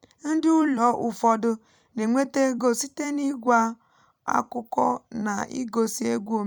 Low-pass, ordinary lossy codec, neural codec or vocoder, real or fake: 19.8 kHz; none; vocoder, 44.1 kHz, 128 mel bands every 256 samples, BigVGAN v2; fake